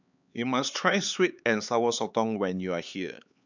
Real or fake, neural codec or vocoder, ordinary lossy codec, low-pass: fake; codec, 16 kHz, 4 kbps, X-Codec, HuBERT features, trained on LibriSpeech; none; 7.2 kHz